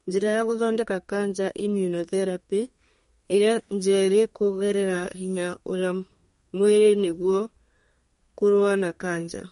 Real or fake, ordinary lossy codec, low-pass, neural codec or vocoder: fake; MP3, 48 kbps; 14.4 kHz; codec, 32 kHz, 1.9 kbps, SNAC